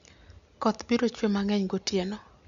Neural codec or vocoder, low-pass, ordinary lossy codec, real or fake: none; 7.2 kHz; Opus, 64 kbps; real